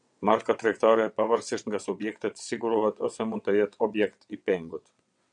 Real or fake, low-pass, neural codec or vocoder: fake; 9.9 kHz; vocoder, 22.05 kHz, 80 mel bands, WaveNeXt